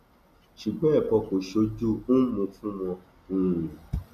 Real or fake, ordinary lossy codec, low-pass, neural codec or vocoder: real; none; 14.4 kHz; none